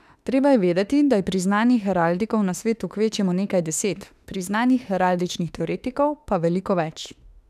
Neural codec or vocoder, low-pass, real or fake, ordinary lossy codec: autoencoder, 48 kHz, 32 numbers a frame, DAC-VAE, trained on Japanese speech; 14.4 kHz; fake; none